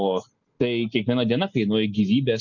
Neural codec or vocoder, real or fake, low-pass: none; real; 7.2 kHz